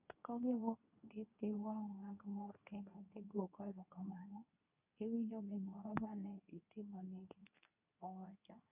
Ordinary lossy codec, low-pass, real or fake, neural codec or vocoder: none; 3.6 kHz; fake; codec, 24 kHz, 0.9 kbps, WavTokenizer, medium speech release version 2